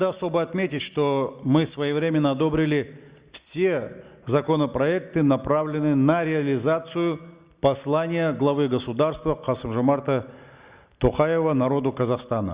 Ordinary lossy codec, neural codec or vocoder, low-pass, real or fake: Opus, 64 kbps; none; 3.6 kHz; real